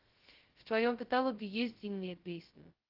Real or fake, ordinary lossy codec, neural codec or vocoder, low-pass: fake; Opus, 16 kbps; codec, 16 kHz, 0.2 kbps, FocalCodec; 5.4 kHz